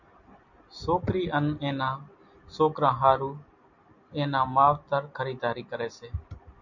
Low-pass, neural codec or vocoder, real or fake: 7.2 kHz; none; real